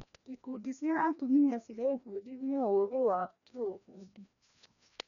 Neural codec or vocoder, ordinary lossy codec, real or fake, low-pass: codec, 16 kHz, 1 kbps, FreqCodec, larger model; none; fake; 7.2 kHz